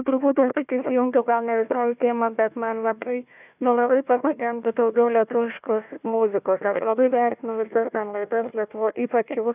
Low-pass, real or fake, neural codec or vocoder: 3.6 kHz; fake; codec, 16 kHz, 1 kbps, FunCodec, trained on Chinese and English, 50 frames a second